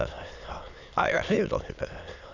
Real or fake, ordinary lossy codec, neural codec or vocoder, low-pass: fake; Opus, 64 kbps; autoencoder, 22.05 kHz, a latent of 192 numbers a frame, VITS, trained on many speakers; 7.2 kHz